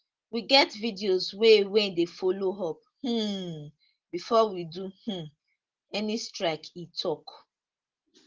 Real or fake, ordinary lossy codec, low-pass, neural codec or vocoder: real; Opus, 16 kbps; 7.2 kHz; none